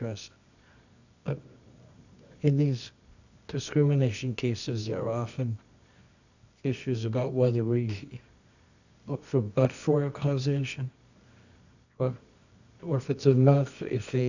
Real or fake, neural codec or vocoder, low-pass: fake; codec, 24 kHz, 0.9 kbps, WavTokenizer, medium music audio release; 7.2 kHz